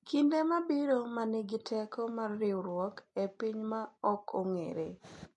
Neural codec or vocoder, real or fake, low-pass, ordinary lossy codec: none; real; 10.8 kHz; MP3, 48 kbps